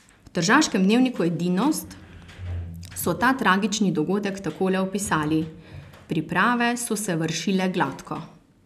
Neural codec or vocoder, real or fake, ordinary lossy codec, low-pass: none; real; none; 14.4 kHz